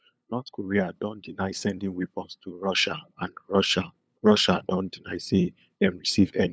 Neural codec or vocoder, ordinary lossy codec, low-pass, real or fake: codec, 16 kHz, 8 kbps, FunCodec, trained on LibriTTS, 25 frames a second; none; none; fake